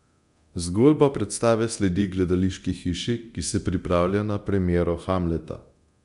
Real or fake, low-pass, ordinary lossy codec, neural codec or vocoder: fake; 10.8 kHz; none; codec, 24 kHz, 0.9 kbps, DualCodec